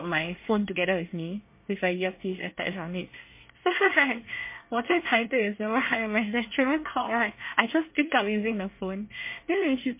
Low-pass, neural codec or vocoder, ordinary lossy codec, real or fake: 3.6 kHz; codec, 24 kHz, 1 kbps, SNAC; MP3, 24 kbps; fake